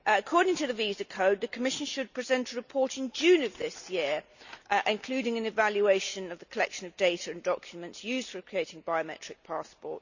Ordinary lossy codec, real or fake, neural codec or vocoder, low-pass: none; real; none; 7.2 kHz